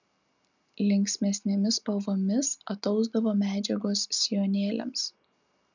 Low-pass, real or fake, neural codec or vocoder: 7.2 kHz; real; none